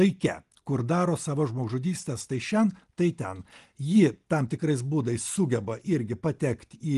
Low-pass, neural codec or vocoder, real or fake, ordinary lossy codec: 10.8 kHz; none; real; Opus, 24 kbps